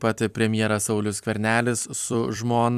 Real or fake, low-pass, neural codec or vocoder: fake; 14.4 kHz; vocoder, 44.1 kHz, 128 mel bands every 256 samples, BigVGAN v2